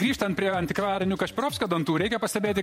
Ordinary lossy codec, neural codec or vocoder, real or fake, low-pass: MP3, 64 kbps; vocoder, 48 kHz, 128 mel bands, Vocos; fake; 19.8 kHz